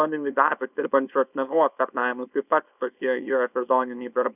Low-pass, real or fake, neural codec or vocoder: 3.6 kHz; fake; codec, 24 kHz, 0.9 kbps, WavTokenizer, small release